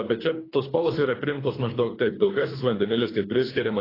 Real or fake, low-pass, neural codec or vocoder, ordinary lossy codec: fake; 5.4 kHz; codec, 24 kHz, 3 kbps, HILCodec; AAC, 24 kbps